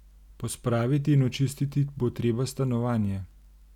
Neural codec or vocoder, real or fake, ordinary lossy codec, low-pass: none; real; none; 19.8 kHz